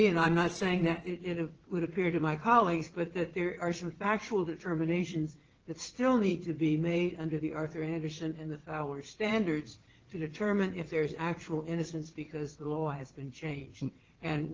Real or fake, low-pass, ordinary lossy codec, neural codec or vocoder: fake; 7.2 kHz; Opus, 16 kbps; vocoder, 22.05 kHz, 80 mel bands, WaveNeXt